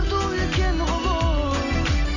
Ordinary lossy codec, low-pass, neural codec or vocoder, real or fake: none; 7.2 kHz; none; real